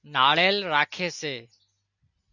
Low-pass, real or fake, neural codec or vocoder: 7.2 kHz; real; none